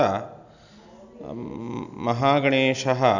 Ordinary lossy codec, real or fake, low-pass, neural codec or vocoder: none; real; 7.2 kHz; none